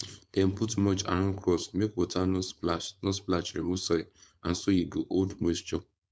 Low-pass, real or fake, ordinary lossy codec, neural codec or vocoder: none; fake; none; codec, 16 kHz, 4.8 kbps, FACodec